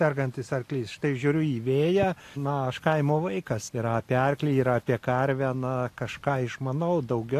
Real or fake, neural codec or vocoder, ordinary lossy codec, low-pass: real; none; AAC, 64 kbps; 14.4 kHz